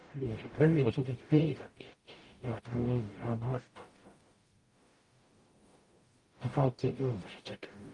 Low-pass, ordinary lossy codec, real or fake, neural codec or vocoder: 10.8 kHz; Opus, 32 kbps; fake; codec, 44.1 kHz, 0.9 kbps, DAC